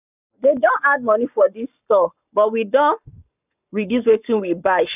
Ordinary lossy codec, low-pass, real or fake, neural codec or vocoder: none; 3.6 kHz; fake; codec, 44.1 kHz, 7.8 kbps, Pupu-Codec